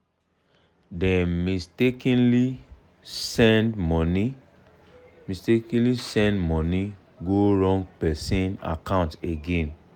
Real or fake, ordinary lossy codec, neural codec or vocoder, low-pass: real; none; none; 19.8 kHz